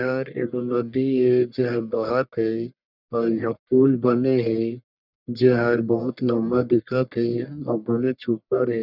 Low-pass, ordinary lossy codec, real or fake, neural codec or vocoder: 5.4 kHz; none; fake; codec, 44.1 kHz, 1.7 kbps, Pupu-Codec